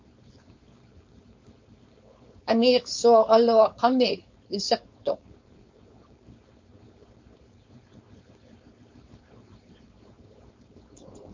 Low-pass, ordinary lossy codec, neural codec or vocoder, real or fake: 7.2 kHz; MP3, 48 kbps; codec, 16 kHz, 4.8 kbps, FACodec; fake